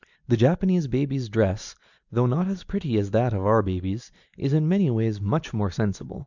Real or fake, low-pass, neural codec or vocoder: real; 7.2 kHz; none